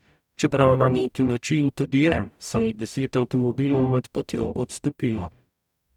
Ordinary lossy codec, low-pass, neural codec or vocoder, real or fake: none; 19.8 kHz; codec, 44.1 kHz, 0.9 kbps, DAC; fake